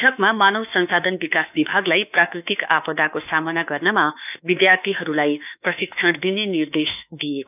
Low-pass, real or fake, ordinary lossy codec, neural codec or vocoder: 3.6 kHz; fake; none; autoencoder, 48 kHz, 32 numbers a frame, DAC-VAE, trained on Japanese speech